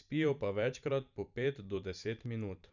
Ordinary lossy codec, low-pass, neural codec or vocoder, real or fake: none; 7.2 kHz; vocoder, 44.1 kHz, 128 mel bands every 512 samples, BigVGAN v2; fake